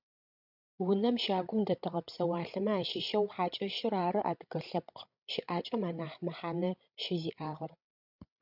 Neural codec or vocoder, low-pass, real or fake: codec, 16 kHz, 16 kbps, FreqCodec, larger model; 5.4 kHz; fake